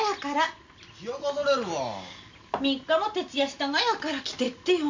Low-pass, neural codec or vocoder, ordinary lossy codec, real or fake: 7.2 kHz; none; MP3, 48 kbps; real